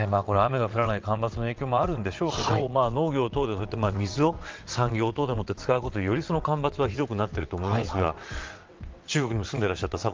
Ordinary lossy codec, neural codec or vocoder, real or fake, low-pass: Opus, 32 kbps; vocoder, 22.05 kHz, 80 mel bands, WaveNeXt; fake; 7.2 kHz